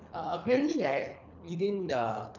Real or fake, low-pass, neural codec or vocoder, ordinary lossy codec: fake; 7.2 kHz; codec, 24 kHz, 3 kbps, HILCodec; none